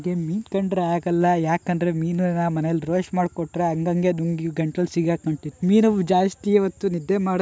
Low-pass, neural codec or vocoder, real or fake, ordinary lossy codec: none; none; real; none